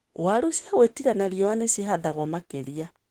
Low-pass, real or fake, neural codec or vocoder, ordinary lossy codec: 19.8 kHz; fake; autoencoder, 48 kHz, 32 numbers a frame, DAC-VAE, trained on Japanese speech; Opus, 16 kbps